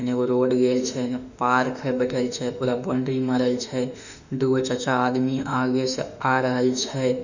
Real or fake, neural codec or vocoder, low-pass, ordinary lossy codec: fake; autoencoder, 48 kHz, 32 numbers a frame, DAC-VAE, trained on Japanese speech; 7.2 kHz; none